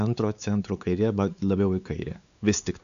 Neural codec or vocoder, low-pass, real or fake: codec, 16 kHz, 4 kbps, X-Codec, WavLM features, trained on Multilingual LibriSpeech; 7.2 kHz; fake